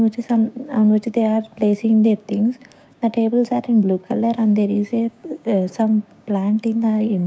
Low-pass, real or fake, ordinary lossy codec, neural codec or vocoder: none; fake; none; codec, 16 kHz, 6 kbps, DAC